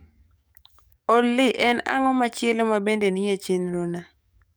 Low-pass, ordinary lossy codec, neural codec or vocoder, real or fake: none; none; codec, 44.1 kHz, 7.8 kbps, DAC; fake